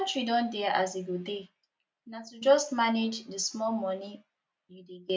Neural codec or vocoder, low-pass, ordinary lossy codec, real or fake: none; none; none; real